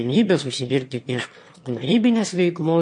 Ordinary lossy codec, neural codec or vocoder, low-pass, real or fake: MP3, 48 kbps; autoencoder, 22.05 kHz, a latent of 192 numbers a frame, VITS, trained on one speaker; 9.9 kHz; fake